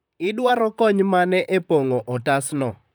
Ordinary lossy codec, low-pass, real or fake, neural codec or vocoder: none; none; fake; vocoder, 44.1 kHz, 128 mel bands, Pupu-Vocoder